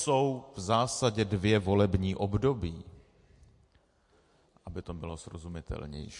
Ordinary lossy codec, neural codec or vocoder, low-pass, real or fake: MP3, 48 kbps; none; 10.8 kHz; real